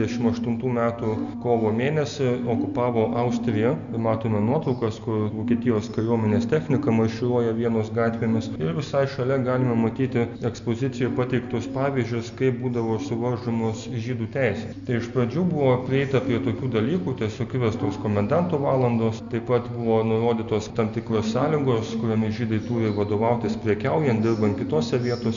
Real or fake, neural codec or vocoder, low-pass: real; none; 7.2 kHz